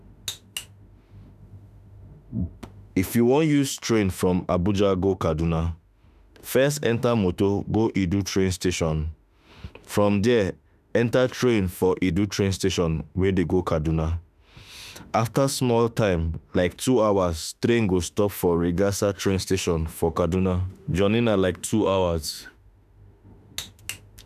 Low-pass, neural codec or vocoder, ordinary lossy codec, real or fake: 14.4 kHz; autoencoder, 48 kHz, 32 numbers a frame, DAC-VAE, trained on Japanese speech; none; fake